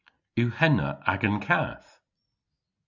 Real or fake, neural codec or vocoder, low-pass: real; none; 7.2 kHz